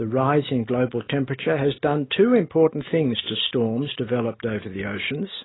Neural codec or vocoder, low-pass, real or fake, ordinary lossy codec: none; 7.2 kHz; real; AAC, 16 kbps